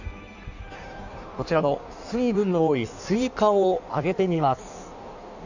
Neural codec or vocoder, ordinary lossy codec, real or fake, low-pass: codec, 16 kHz in and 24 kHz out, 1.1 kbps, FireRedTTS-2 codec; none; fake; 7.2 kHz